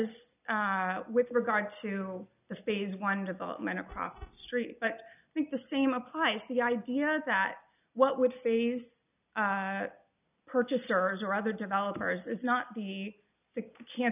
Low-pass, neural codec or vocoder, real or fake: 3.6 kHz; none; real